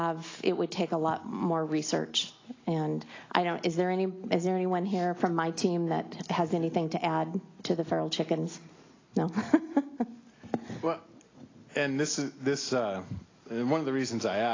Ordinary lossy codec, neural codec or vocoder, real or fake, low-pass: AAC, 32 kbps; none; real; 7.2 kHz